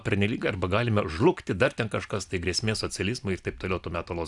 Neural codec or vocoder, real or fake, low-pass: none; real; 10.8 kHz